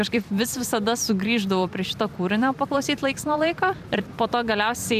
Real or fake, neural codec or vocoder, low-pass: fake; vocoder, 44.1 kHz, 128 mel bands every 256 samples, BigVGAN v2; 14.4 kHz